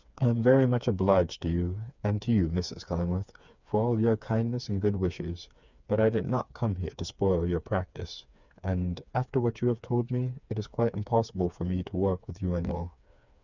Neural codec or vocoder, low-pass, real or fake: codec, 16 kHz, 4 kbps, FreqCodec, smaller model; 7.2 kHz; fake